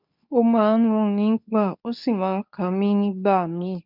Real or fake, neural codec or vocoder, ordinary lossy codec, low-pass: fake; codec, 24 kHz, 0.9 kbps, WavTokenizer, medium speech release version 2; none; 5.4 kHz